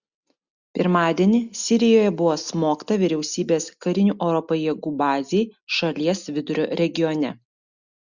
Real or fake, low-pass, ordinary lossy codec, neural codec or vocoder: real; 7.2 kHz; Opus, 64 kbps; none